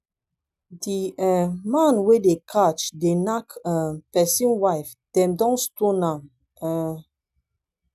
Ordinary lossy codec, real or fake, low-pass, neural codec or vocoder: none; real; 14.4 kHz; none